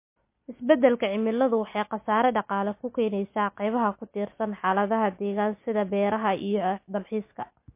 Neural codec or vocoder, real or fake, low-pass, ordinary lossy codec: none; real; 3.6 kHz; MP3, 24 kbps